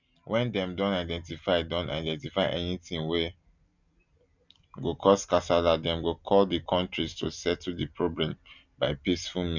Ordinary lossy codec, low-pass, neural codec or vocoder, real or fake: none; 7.2 kHz; none; real